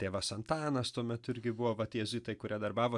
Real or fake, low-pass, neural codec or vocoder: real; 10.8 kHz; none